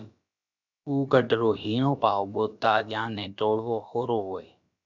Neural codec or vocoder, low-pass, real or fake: codec, 16 kHz, about 1 kbps, DyCAST, with the encoder's durations; 7.2 kHz; fake